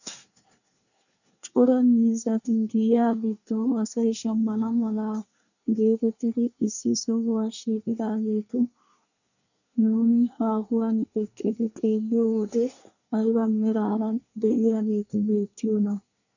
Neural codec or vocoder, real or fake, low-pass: codec, 24 kHz, 1 kbps, SNAC; fake; 7.2 kHz